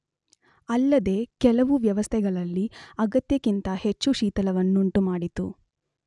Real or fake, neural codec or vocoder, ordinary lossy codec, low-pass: real; none; none; 10.8 kHz